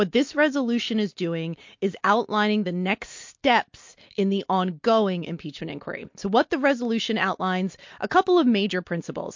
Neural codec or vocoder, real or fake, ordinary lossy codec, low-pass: none; real; MP3, 48 kbps; 7.2 kHz